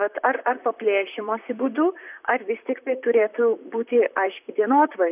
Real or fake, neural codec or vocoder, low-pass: fake; vocoder, 44.1 kHz, 128 mel bands, Pupu-Vocoder; 3.6 kHz